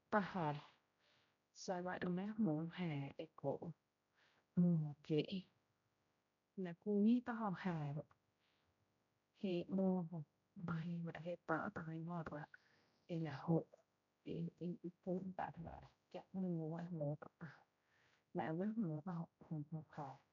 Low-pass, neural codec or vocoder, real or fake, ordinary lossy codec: 7.2 kHz; codec, 16 kHz, 0.5 kbps, X-Codec, HuBERT features, trained on general audio; fake; none